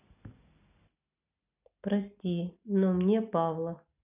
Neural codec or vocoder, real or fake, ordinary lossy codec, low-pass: none; real; none; 3.6 kHz